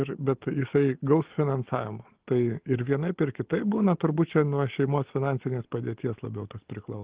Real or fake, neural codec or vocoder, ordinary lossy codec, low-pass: real; none; Opus, 16 kbps; 3.6 kHz